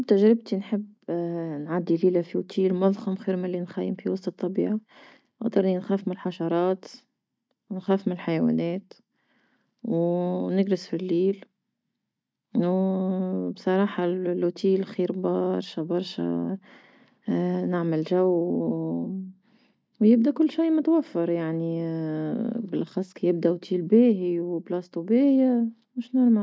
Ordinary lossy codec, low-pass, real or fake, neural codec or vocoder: none; none; real; none